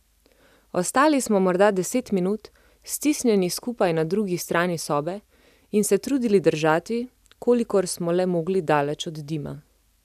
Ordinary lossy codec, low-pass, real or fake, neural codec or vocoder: none; 14.4 kHz; real; none